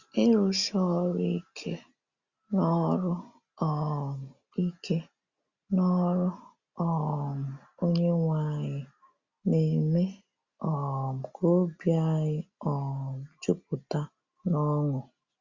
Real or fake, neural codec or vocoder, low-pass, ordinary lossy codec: real; none; 7.2 kHz; Opus, 64 kbps